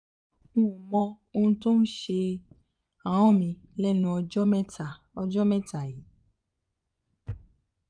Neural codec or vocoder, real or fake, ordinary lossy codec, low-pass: vocoder, 24 kHz, 100 mel bands, Vocos; fake; none; 9.9 kHz